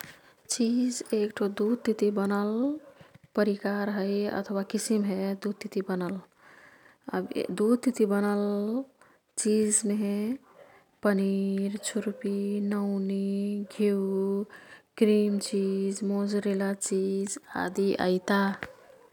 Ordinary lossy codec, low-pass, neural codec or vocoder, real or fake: none; 19.8 kHz; none; real